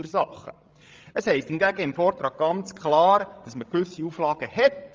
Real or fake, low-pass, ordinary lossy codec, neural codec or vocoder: fake; 7.2 kHz; Opus, 24 kbps; codec, 16 kHz, 16 kbps, FreqCodec, larger model